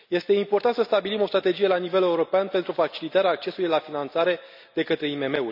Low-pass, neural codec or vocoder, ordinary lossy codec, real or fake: 5.4 kHz; none; none; real